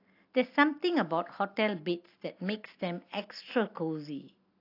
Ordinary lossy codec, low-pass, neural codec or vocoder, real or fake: AAC, 32 kbps; 5.4 kHz; none; real